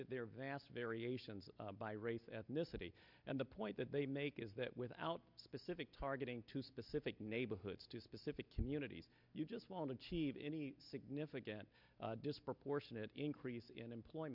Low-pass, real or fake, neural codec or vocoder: 5.4 kHz; real; none